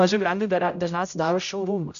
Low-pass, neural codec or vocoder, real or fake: 7.2 kHz; codec, 16 kHz, 0.5 kbps, X-Codec, HuBERT features, trained on general audio; fake